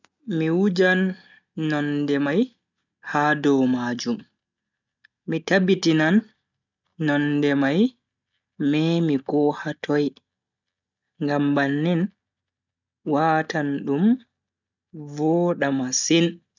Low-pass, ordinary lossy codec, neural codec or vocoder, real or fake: 7.2 kHz; none; none; real